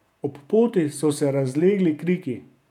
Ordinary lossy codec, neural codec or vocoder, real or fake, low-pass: none; vocoder, 48 kHz, 128 mel bands, Vocos; fake; 19.8 kHz